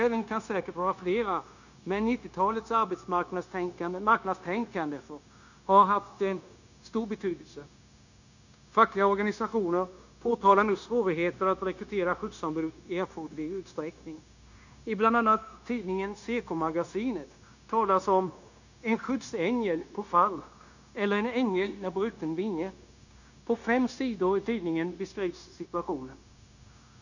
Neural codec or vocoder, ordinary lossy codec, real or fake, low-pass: codec, 16 kHz, 0.9 kbps, LongCat-Audio-Codec; none; fake; 7.2 kHz